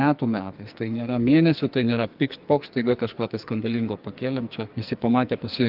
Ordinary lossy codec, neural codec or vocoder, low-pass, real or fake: Opus, 24 kbps; codec, 44.1 kHz, 2.6 kbps, SNAC; 5.4 kHz; fake